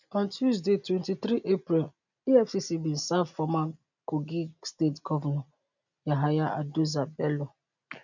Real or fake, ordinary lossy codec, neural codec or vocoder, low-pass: real; none; none; 7.2 kHz